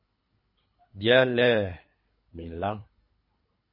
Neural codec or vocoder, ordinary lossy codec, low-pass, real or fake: codec, 24 kHz, 3 kbps, HILCodec; MP3, 24 kbps; 5.4 kHz; fake